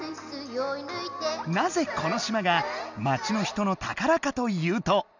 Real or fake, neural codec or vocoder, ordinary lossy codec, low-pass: real; none; none; 7.2 kHz